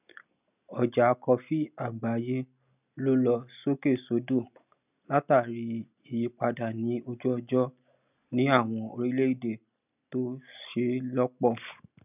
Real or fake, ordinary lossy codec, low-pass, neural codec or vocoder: real; none; 3.6 kHz; none